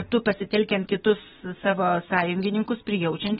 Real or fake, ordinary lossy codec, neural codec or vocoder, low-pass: real; AAC, 16 kbps; none; 14.4 kHz